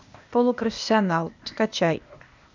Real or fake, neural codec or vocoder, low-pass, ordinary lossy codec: fake; codec, 16 kHz, 0.8 kbps, ZipCodec; 7.2 kHz; MP3, 64 kbps